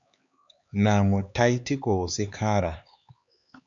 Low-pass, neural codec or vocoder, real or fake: 7.2 kHz; codec, 16 kHz, 4 kbps, X-Codec, HuBERT features, trained on LibriSpeech; fake